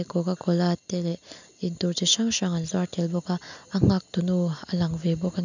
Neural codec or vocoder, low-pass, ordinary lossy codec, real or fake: none; 7.2 kHz; none; real